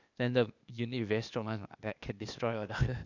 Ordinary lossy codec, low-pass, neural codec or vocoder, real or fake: none; 7.2 kHz; codec, 16 kHz, 0.8 kbps, ZipCodec; fake